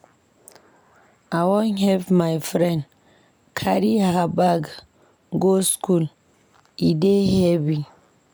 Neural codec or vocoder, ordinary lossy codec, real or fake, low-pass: none; none; real; none